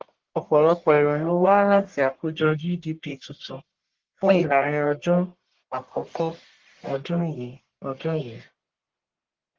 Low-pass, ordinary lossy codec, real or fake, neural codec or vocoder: 7.2 kHz; Opus, 16 kbps; fake; codec, 44.1 kHz, 1.7 kbps, Pupu-Codec